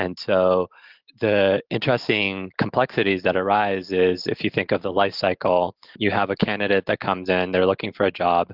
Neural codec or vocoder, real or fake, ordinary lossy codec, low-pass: none; real; Opus, 16 kbps; 5.4 kHz